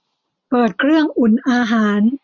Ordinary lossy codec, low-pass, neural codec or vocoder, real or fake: none; 7.2 kHz; none; real